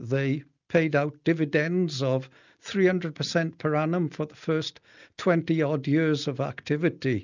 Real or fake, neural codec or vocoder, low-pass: real; none; 7.2 kHz